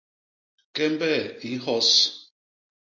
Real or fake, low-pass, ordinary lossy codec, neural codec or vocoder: real; 7.2 kHz; MP3, 48 kbps; none